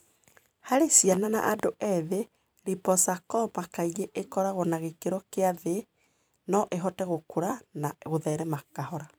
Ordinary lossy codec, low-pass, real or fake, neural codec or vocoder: none; none; fake; vocoder, 44.1 kHz, 128 mel bands every 256 samples, BigVGAN v2